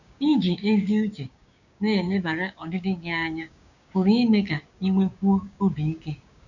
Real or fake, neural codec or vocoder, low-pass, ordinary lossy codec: fake; codec, 16 kHz, 6 kbps, DAC; 7.2 kHz; none